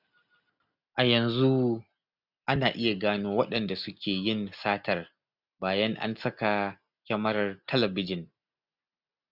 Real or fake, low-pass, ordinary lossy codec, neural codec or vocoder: real; 5.4 kHz; none; none